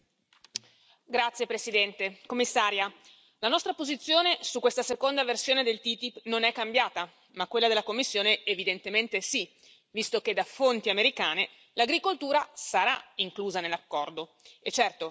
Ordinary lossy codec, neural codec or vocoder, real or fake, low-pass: none; none; real; none